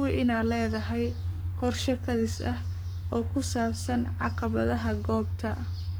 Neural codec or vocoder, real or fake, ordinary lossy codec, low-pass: codec, 44.1 kHz, 7.8 kbps, Pupu-Codec; fake; none; none